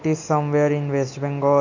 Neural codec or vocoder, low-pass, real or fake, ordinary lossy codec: none; 7.2 kHz; real; none